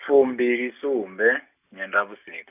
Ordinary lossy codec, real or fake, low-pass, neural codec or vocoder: none; real; 3.6 kHz; none